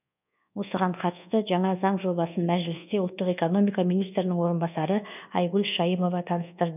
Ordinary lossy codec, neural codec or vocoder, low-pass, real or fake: none; codec, 24 kHz, 1.2 kbps, DualCodec; 3.6 kHz; fake